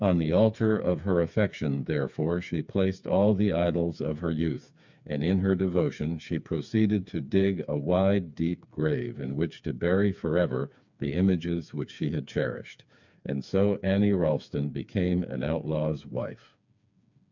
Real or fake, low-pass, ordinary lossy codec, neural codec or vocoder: fake; 7.2 kHz; MP3, 64 kbps; codec, 16 kHz, 4 kbps, FreqCodec, smaller model